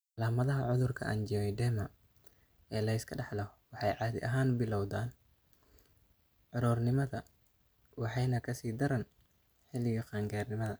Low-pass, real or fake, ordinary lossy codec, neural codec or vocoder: none; real; none; none